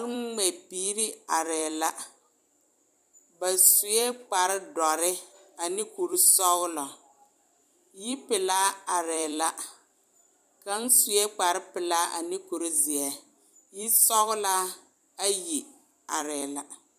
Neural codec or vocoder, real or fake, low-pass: vocoder, 44.1 kHz, 128 mel bands every 256 samples, BigVGAN v2; fake; 14.4 kHz